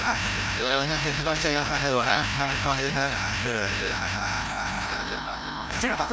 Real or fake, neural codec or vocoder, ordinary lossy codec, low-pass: fake; codec, 16 kHz, 0.5 kbps, FreqCodec, larger model; none; none